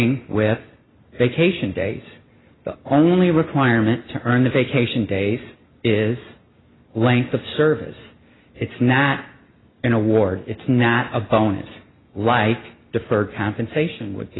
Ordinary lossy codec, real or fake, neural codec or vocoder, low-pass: AAC, 16 kbps; real; none; 7.2 kHz